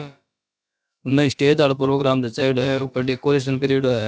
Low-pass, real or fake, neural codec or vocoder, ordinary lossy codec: none; fake; codec, 16 kHz, about 1 kbps, DyCAST, with the encoder's durations; none